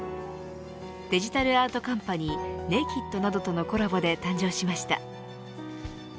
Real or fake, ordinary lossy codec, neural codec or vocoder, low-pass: real; none; none; none